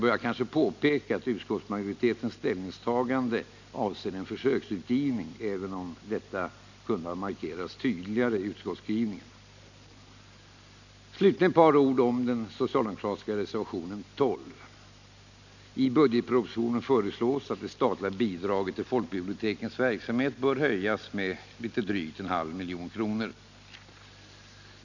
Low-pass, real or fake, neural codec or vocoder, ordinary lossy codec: 7.2 kHz; real; none; none